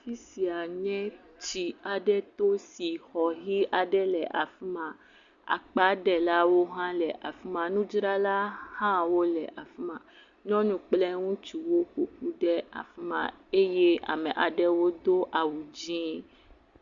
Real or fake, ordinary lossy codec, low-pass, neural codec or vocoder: real; Opus, 64 kbps; 7.2 kHz; none